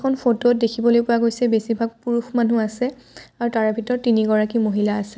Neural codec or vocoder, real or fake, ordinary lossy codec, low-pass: none; real; none; none